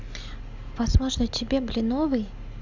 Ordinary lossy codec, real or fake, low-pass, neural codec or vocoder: none; real; 7.2 kHz; none